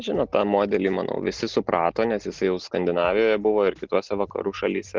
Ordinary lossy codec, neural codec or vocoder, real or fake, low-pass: Opus, 16 kbps; none; real; 7.2 kHz